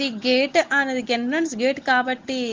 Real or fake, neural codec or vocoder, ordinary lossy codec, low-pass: real; none; Opus, 16 kbps; 7.2 kHz